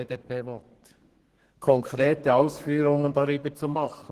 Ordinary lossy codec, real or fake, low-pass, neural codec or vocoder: Opus, 16 kbps; fake; 14.4 kHz; codec, 32 kHz, 1.9 kbps, SNAC